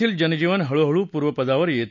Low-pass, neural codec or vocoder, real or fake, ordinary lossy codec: 7.2 kHz; none; real; none